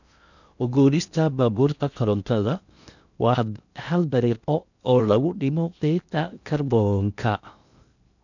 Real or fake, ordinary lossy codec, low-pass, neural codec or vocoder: fake; none; 7.2 kHz; codec, 16 kHz in and 24 kHz out, 0.6 kbps, FocalCodec, streaming, 4096 codes